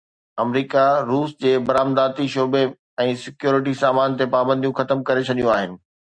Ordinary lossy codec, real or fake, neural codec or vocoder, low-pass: Opus, 64 kbps; real; none; 9.9 kHz